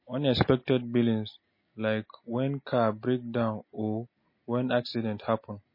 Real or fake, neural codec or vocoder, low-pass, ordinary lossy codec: real; none; 5.4 kHz; MP3, 24 kbps